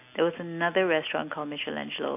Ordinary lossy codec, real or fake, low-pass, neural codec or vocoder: AAC, 32 kbps; real; 3.6 kHz; none